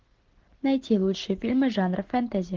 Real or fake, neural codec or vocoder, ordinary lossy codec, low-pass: real; none; Opus, 16 kbps; 7.2 kHz